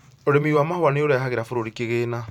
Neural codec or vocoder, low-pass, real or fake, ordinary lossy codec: vocoder, 44.1 kHz, 128 mel bands every 512 samples, BigVGAN v2; 19.8 kHz; fake; none